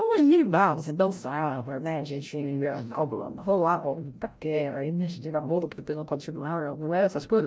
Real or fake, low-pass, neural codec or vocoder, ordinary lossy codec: fake; none; codec, 16 kHz, 0.5 kbps, FreqCodec, larger model; none